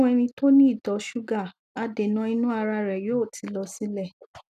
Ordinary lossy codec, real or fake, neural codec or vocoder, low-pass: none; real; none; 14.4 kHz